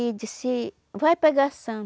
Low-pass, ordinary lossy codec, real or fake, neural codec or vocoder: none; none; real; none